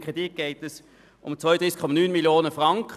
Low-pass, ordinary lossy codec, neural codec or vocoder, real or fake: 14.4 kHz; none; none; real